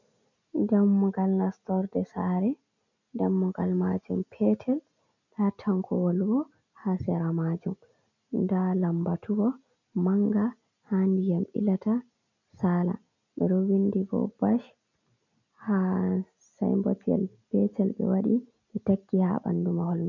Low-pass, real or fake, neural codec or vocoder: 7.2 kHz; real; none